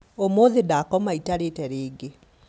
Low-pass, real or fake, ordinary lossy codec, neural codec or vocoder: none; real; none; none